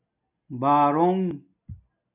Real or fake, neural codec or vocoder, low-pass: real; none; 3.6 kHz